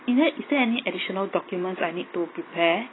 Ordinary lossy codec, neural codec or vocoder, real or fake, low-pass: AAC, 16 kbps; none; real; 7.2 kHz